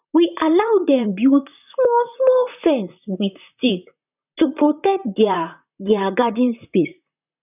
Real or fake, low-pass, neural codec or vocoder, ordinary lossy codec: fake; 3.6 kHz; vocoder, 44.1 kHz, 128 mel bands, Pupu-Vocoder; none